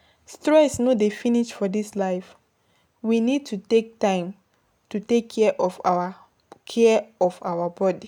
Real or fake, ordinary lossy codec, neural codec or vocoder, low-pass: real; none; none; 19.8 kHz